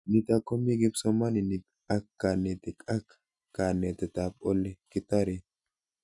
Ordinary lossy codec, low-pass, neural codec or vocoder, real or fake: none; 10.8 kHz; none; real